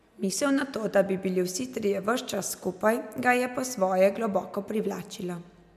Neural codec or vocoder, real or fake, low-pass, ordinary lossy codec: none; real; 14.4 kHz; none